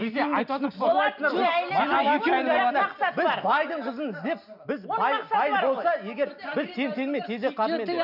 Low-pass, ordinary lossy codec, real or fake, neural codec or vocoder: 5.4 kHz; none; real; none